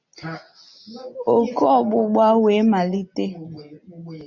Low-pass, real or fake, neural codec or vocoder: 7.2 kHz; real; none